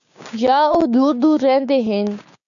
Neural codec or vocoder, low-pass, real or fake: codec, 16 kHz, 6 kbps, DAC; 7.2 kHz; fake